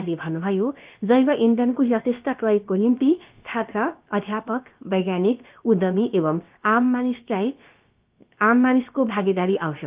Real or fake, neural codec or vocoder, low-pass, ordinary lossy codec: fake; codec, 16 kHz, about 1 kbps, DyCAST, with the encoder's durations; 3.6 kHz; Opus, 32 kbps